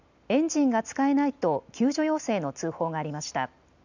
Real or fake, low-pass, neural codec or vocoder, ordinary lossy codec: real; 7.2 kHz; none; none